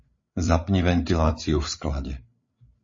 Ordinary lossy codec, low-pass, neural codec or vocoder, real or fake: MP3, 32 kbps; 7.2 kHz; codec, 16 kHz, 8 kbps, FreqCodec, larger model; fake